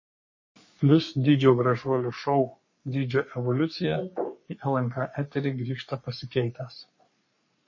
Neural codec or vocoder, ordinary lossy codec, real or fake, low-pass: codec, 44.1 kHz, 3.4 kbps, Pupu-Codec; MP3, 32 kbps; fake; 7.2 kHz